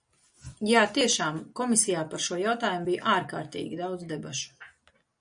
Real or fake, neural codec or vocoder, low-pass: real; none; 9.9 kHz